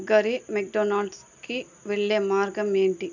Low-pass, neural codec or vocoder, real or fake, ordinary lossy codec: 7.2 kHz; none; real; none